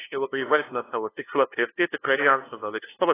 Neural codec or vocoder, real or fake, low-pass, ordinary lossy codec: codec, 16 kHz, 0.5 kbps, FunCodec, trained on LibriTTS, 25 frames a second; fake; 3.6 kHz; AAC, 16 kbps